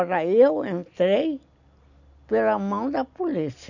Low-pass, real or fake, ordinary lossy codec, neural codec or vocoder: 7.2 kHz; real; none; none